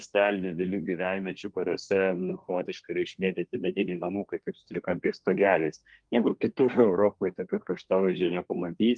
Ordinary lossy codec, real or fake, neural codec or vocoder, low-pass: Opus, 24 kbps; fake; codec, 24 kHz, 1 kbps, SNAC; 9.9 kHz